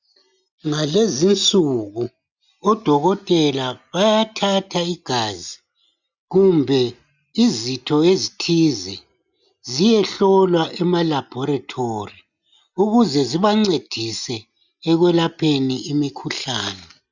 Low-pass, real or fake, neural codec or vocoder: 7.2 kHz; real; none